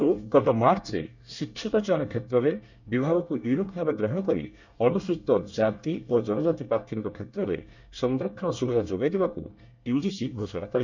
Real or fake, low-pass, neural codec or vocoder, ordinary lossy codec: fake; 7.2 kHz; codec, 24 kHz, 1 kbps, SNAC; none